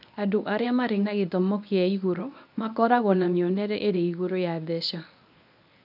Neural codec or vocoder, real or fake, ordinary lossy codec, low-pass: codec, 24 kHz, 0.9 kbps, WavTokenizer, medium speech release version 1; fake; none; 5.4 kHz